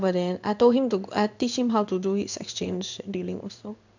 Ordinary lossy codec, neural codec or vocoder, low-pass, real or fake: none; codec, 16 kHz in and 24 kHz out, 1 kbps, XY-Tokenizer; 7.2 kHz; fake